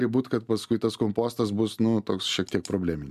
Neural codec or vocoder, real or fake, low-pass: none; real; 14.4 kHz